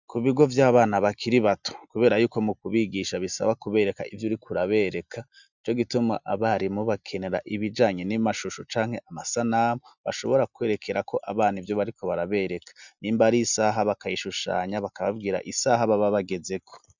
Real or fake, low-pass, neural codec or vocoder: real; 7.2 kHz; none